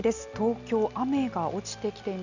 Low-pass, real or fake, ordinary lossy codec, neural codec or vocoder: 7.2 kHz; real; none; none